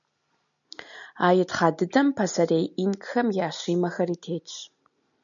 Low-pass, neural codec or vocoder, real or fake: 7.2 kHz; none; real